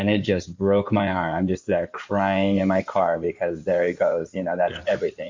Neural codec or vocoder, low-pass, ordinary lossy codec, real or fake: codec, 16 kHz in and 24 kHz out, 2.2 kbps, FireRedTTS-2 codec; 7.2 kHz; MP3, 48 kbps; fake